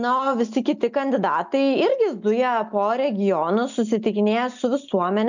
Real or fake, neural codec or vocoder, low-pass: real; none; 7.2 kHz